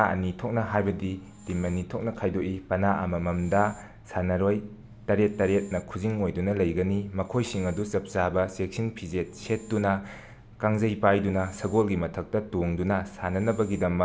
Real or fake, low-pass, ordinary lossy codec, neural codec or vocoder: real; none; none; none